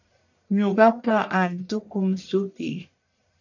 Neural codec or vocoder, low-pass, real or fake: codec, 44.1 kHz, 1.7 kbps, Pupu-Codec; 7.2 kHz; fake